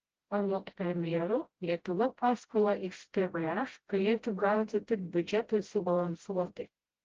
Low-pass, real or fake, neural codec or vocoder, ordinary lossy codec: 7.2 kHz; fake; codec, 16 kHz, 0.5 kbps, FreqCodec, smaller model; Opus, 16 kbps